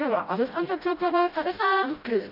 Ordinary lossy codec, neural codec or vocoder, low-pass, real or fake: AAC, 48 kbps; codec, 16 kHz, 0.5 kbps, FreqCodec, smaller model; 5.4 kHz; fake